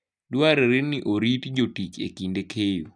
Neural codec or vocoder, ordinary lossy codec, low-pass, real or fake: none; none; 9.9 kHz; real